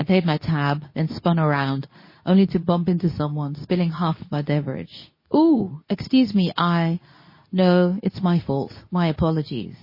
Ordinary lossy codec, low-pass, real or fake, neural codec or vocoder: MP3, 24 kbps; 5.4 kHz; fake; codec, 24 kHz, 0.9 kbps, WavTokenizer, medium speech release version 2